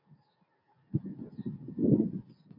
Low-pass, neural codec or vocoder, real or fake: 5.4 kHz; none; real